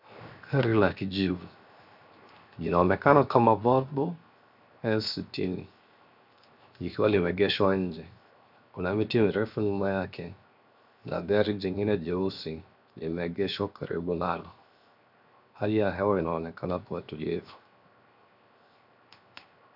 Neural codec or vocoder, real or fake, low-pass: codec, 16 kHz, 0.7 kbps, FocalCodec; fake; 5.4 kHz